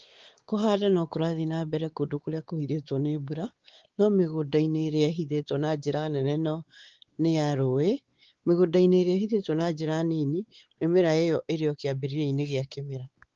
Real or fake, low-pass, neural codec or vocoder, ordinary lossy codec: fake; 7.2 kHz; codec, 16 kHz, 4 kbps, X-Codec, WavLM features, trained on Multilingual LibriSpeech; Opus, 16 kbps